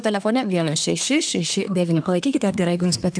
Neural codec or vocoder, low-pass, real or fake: codec, 24 kHz, 1 kbps, SNAC; 9.9 kHz; fake